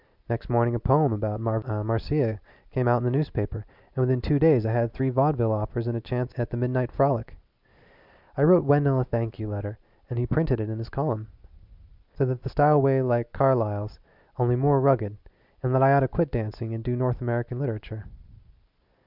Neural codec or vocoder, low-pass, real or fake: none; 5.4 kHz; real